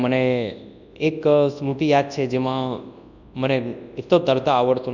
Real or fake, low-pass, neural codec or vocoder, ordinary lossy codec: fake; 7.2 kHz; codec, 24 kHz, 0.9 kbps, WavTokenizer, large speech release; none